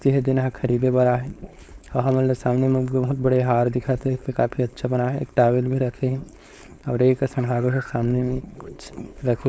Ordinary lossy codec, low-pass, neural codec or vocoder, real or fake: none; none; codec, 16 kHz, 4.8 kbps, FACodec; fake